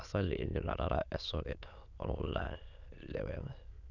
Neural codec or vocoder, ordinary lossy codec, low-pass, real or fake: autoencoder, 22.05 kHz, a latent of 192 numbers a frame, VITS, trained on many speakers; none; 7.2 kHz; fake